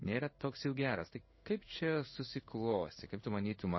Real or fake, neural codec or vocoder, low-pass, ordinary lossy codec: fake; codec, 16 kHz in and 24 kHz out, 1 kbps, XY-Tokenizer; 7.2 kHz; MP3, 24 kbps